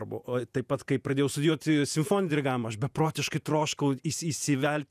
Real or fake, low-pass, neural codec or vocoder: fake; 14.4 kHz; vocoder, 48 kHz, 128 mel bands, Vocos